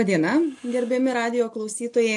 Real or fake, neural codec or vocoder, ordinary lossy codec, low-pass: real; none; AAC, 64 kbps; 10.8 kHz